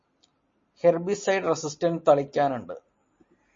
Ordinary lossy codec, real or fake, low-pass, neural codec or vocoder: MP3, 32 kbps; real; 7.2 kHz; none